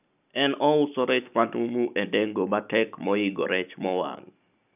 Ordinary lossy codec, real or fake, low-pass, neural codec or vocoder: none; real; 3.6 kHz; none